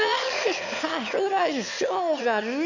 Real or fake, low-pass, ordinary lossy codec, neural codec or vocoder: fake; 7.2 kHz; none; autoencoder, 22.05 kHz, a latent of 192 numbers a frame, VITS, trained on one speaker